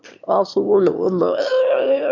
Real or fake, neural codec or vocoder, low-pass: fake; autoencoder, 22.05 kHz, a latent of 192 numbers a frame, VITS, trained on one speaker; 7.2 kHz